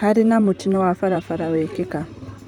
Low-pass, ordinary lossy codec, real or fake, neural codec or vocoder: 19.8 kHz; none; fake; vocoder, 44.1 kHz, 128 mel bands, Pupu-Vocoder